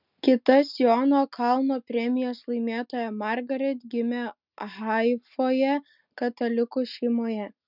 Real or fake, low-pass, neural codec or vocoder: real; 5.4 kHz; none